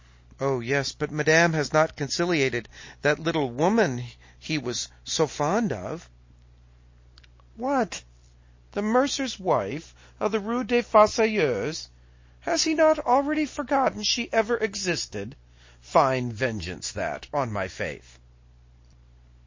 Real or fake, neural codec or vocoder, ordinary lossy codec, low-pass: real; none; MP3, 32 kbps; 7.2 kHz